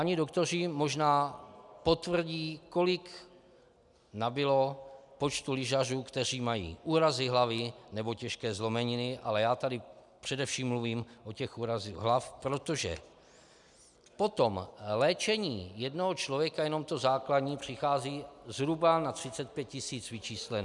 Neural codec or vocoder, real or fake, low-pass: none; real; 10.8 kHz